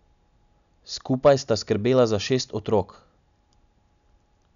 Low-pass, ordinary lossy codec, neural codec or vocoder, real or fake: 7.2 kHz; none; none; real